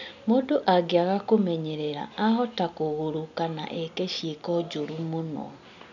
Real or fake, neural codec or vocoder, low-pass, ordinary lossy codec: real; none; 7.2 kHz; none